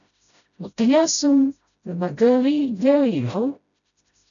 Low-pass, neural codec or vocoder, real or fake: 7.2 kHz; codec, 16 kHz, 0.5 kbps, FreqCodec, smaller model; fake